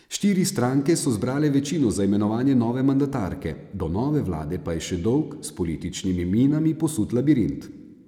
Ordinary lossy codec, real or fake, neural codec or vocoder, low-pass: none; real; none; 19.8 kHz